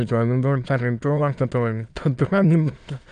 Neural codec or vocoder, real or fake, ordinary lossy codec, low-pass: autoencoder, 22.05 kHz, a latent of 192 numbers a frame, VITS, trained on many speakers; fake; none; 9.9 kHz